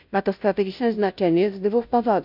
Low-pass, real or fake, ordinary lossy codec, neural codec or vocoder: 5.4 kHz; fake; none; codec, 16 kHz, 0.5 kbps, FunCodec, trained on Chinese and English, 25 frames a second